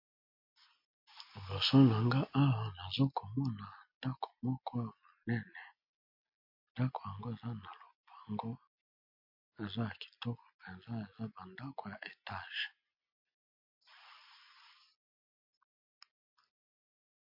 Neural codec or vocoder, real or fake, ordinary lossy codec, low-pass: none; real; MP3, 32 kbps; 5.4 kHz